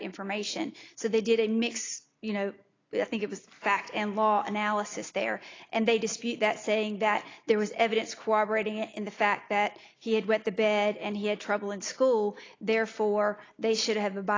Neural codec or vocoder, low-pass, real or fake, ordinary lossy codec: none; 7.2 kHz; real; AAC, 32 kbps